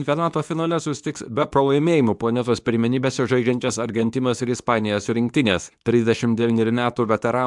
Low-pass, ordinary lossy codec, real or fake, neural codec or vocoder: 10.8 kHz; MP3, 96 kbps; fake; codec, 24 kHz, 0.9 kbps, WavTokenizer, medium speech release version 2